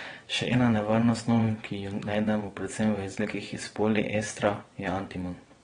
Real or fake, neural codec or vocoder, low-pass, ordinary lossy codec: fake; vocoder, 22.05 kHz, 80 mel bands, WaveNeXt; 9.9 kHz; AAC, 32 kbps